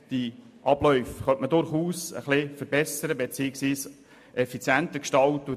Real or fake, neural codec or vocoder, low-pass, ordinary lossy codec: real; none; 14.4 kHz; MP3, 64 kbps